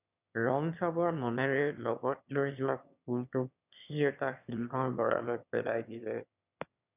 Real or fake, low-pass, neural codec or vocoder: fake; 3.6 kHz; autoencoder, 22.05 kHz, a latent of 192 numbers a frame, VITS, trained on one speaker